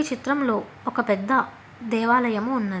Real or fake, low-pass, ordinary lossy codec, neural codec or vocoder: real; none; none; none